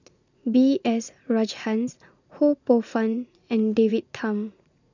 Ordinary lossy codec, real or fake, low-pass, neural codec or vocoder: none; fake; 7.2 kHz; vocoder, 44.1 kHz, 80 mel bands, Vocos